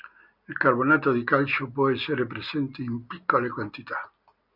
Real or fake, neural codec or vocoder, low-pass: real; none; 5.4 kHz